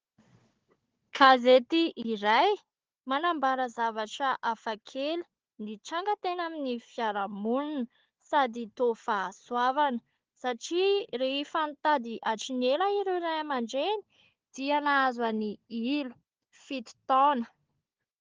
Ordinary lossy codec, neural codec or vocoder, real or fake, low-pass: Opus, 16 kbps; codec, 16 kHz, 4 kbps, FunCodec, trained on Chinese and English, 50 frames a second; fake; 7.2 kHz